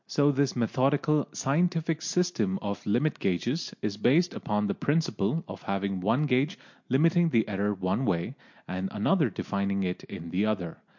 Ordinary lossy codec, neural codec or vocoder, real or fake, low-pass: MP3, 48 kbps; none; real; 7.2 kHz